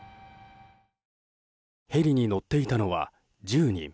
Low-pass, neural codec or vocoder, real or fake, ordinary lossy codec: none; none; real; none